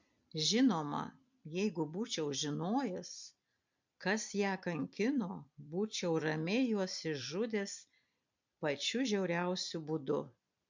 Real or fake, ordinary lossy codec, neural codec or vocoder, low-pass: fake; MP3, 64 kbps; vocoder, 44.1 kHz, 128 mel bands every 256 samples, BigVGAN v2; 7.2 kHz